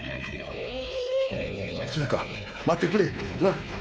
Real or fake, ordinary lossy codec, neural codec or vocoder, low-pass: fake; none; codec, 16 kHz, 2 kbps, X-Codec, WavLM features, trained on Multilingual LibriSpeech; none